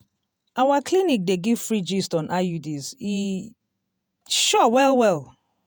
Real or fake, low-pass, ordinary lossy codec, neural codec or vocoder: fake; none; none; vocoder, 48 kHz, 128 mel bands, Vocos